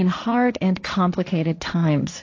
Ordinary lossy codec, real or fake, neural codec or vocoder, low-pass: AAC, 48 kbps; fake; codec, 16 kHz, 1.1 kbps, Voila-Tokenizer; 7.2 kHz